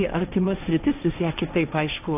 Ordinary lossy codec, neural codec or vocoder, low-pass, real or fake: AAC, 32 kbps; codec, 16 kHz, 1.1 kbps, Voila-Tokenizer; 3.6 kHz; fake